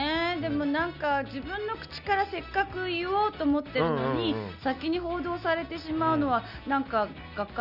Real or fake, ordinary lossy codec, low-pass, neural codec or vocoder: real; none; 5.4 kHz; none